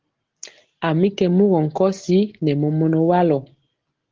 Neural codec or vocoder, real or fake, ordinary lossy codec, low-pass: none; real; Opus, 16 kbps; 7.2 kHz